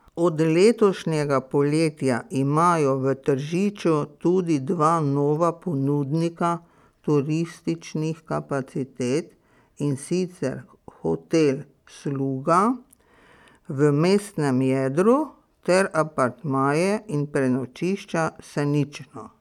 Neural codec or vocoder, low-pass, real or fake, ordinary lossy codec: none; 19.8 kHz; real; none